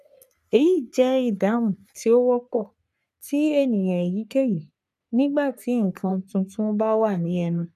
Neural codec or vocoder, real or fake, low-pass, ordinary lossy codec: codec, 44.1 kHz, 3.4 kbps, Pupu-Codec; fake; 14.4 kHz; none